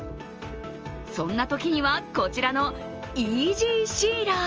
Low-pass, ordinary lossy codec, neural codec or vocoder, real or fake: 7.2 kHz; Opus, 24 kbps; none; real